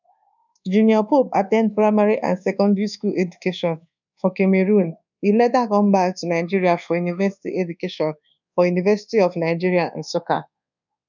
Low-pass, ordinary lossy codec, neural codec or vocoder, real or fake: 7.2 kHz; none; codec, 24 kHz, 1.2 kbps, DualCodec; fake